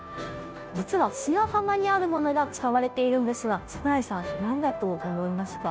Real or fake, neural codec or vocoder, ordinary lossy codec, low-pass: fake; codec, 16 kHz, 0.5 kbps, FunCodec, trained on Chinese and English, 25 frames a second; none; none